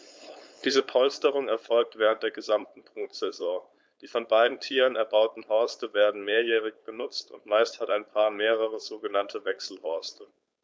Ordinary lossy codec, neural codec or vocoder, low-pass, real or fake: none; codec, 16 kHz, 4.8 kbps, FACodec; none; fake